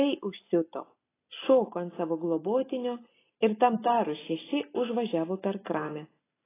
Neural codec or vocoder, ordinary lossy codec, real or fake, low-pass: none; AAC, 16 kbps; real; 3.6 kHz